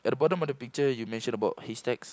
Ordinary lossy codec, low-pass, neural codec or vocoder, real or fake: none; none; none; real